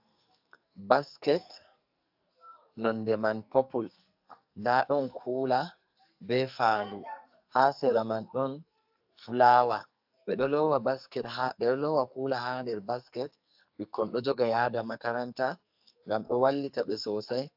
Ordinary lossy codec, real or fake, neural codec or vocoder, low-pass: AAC, 48 kbps; fake; codec, 44.1 kHz, 2.6 kbps, SNAC; 5.4 kHz